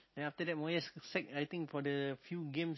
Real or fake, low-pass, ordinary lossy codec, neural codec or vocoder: real; 7.2 kHz; MP3, 24 kbps; none